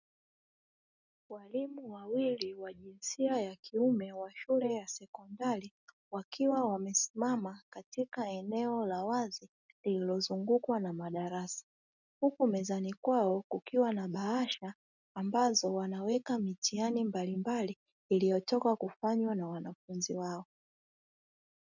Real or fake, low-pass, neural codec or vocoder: real; 7.2 kHz; none